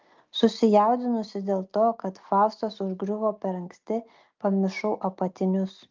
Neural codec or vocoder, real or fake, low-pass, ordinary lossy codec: none; real; 7.2 kHz; Opus, 24 kbps